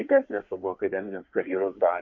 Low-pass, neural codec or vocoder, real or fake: 7.2 kHz; codec, 24 kHz, 1 kbps, SNAC; fake